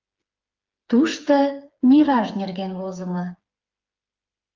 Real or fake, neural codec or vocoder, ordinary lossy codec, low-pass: fake; codec, 16 kHz, 4 kbps, FreqCodec, smaller model; Opus, 24 kbps; 7.2 kHz